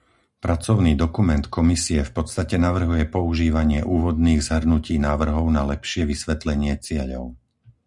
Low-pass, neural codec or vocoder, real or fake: 10.8 kHz; none; real